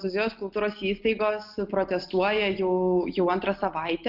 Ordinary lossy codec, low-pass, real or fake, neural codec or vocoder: Opus, 32 kbps; 5.4 kHz; real; none